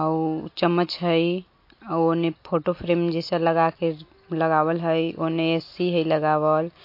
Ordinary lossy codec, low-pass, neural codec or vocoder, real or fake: MP3, 32 kbps; 5.4 kHz; none; real